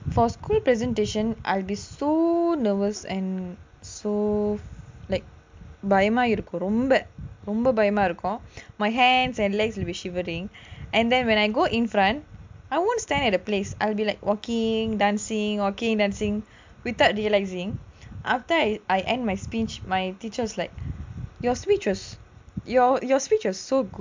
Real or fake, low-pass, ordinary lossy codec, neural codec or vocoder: real; 7.2 kHz; MP3, 64 kbps; none